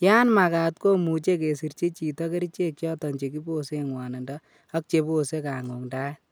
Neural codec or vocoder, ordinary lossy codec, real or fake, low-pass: none; none; real; none